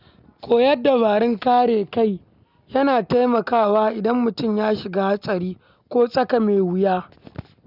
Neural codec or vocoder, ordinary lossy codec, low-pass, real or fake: none; none; 5.4 kHz; real